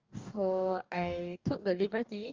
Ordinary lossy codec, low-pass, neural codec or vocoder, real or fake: Opus, 32 kbps; 7.2 kHz; codec, 44.1 kHz, 2.6 kbps, DAC; fake